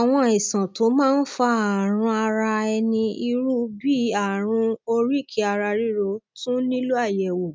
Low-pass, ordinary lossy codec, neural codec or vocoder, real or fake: none; none; none; real